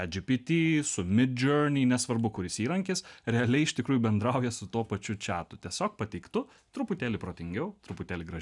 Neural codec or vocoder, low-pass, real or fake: none; 10.8 kHz; real